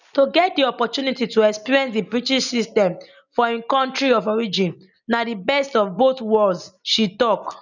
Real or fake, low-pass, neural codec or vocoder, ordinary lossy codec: real; 7.2 kHz; none; none